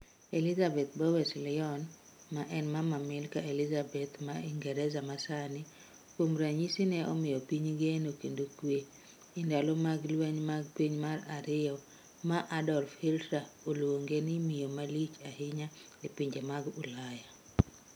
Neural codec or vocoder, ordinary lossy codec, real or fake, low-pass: none; none; real; none